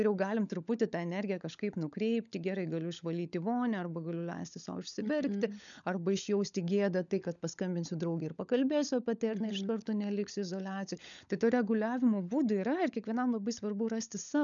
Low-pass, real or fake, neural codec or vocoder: 7.2 kHz; fake; codec, 16 kHz, 16 kbps, FunCodec, trained on LibriTTS, 50 frames a second